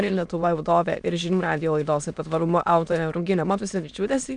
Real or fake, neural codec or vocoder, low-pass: fake; autoencoder, 22.05 kHz, a latent of 192 numbers a frame, VITS, trained on many speakers; 9.9 kHz